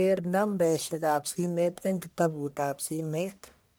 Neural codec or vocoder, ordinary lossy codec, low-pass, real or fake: codec, 44.1 kHz, 1.7 kbps, Pupu-Codec; none; none; fake